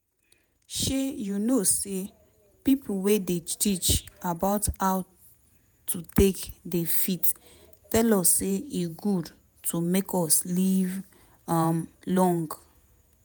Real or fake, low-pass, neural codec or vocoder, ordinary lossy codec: fake; none; vocoder, 48 kHz, 128 mel bands, Vocos; none